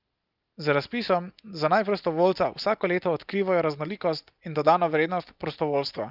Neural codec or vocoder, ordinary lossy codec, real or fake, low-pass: none; Opus, 24 kbps; real; 5.4 kHz